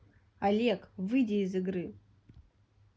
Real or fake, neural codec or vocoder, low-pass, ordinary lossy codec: real; none; none; none